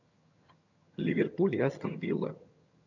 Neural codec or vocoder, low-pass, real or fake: vocoder, 22.05 kHz, 80 mel bands, HiFi-GAN; 7.2 kHz; fake